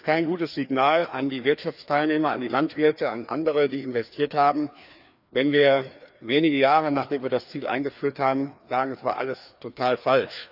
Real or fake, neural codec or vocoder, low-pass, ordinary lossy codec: fake; codec, 16 kHz, 2 kbps, FreqCodec, larger model; 5.4 kHz; none